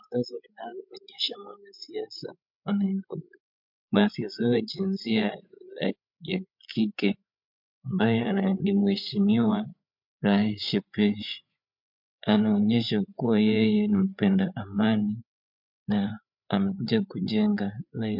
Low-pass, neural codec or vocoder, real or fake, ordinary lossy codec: 5.4 kHz; codec, 16 kHz, 8 kbps, FreqCodec, larger model; fake; MP3, 48 kbps